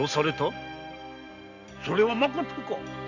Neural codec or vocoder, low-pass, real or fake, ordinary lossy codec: none; 7.2 kHz; real; none